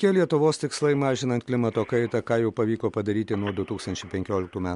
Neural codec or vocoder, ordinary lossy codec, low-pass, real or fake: none; MP3, 64 kbps; 10.8 kHz; real